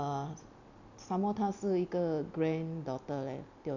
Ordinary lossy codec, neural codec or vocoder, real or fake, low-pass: none; none; real; 7.2 kHz